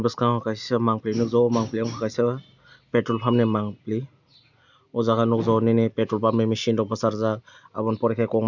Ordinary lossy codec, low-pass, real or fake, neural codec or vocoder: none; 7.2 kHz; real; none